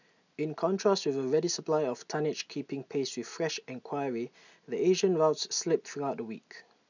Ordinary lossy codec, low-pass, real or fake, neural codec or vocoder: none; 7.2 kHz; real; none